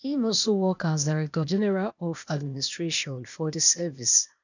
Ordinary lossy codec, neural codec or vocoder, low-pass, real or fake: none; codec, 16 kHz, 0.8 kbps, ZipCodec; 7.2 kHz; fake